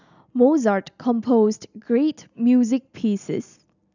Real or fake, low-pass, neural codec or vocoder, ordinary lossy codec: real; 7.2 kHz; none; none